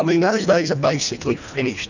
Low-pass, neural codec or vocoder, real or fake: 7.2 kHz; codec, 24 kHz, 1.5 kbps, HILCodec; fake